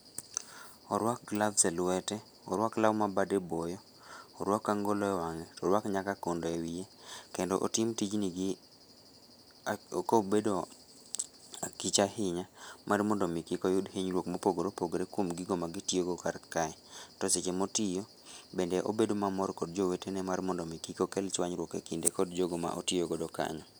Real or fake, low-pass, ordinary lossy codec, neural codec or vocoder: real; none; none; none